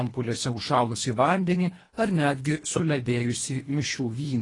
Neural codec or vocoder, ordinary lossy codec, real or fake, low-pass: codec, 24 kHz, 1.5 kbps, HILCodec; AAC, 32 kbps; fake; 10.8 kHz